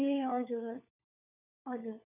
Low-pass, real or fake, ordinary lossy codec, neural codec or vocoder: 3.6 kHz; fake; none; codec, 16 kHz, 4 kbps, FunCodec, trained on LibriTTS, 50 frames a second